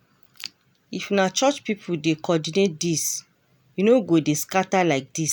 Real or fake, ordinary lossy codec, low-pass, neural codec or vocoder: real; none; none; none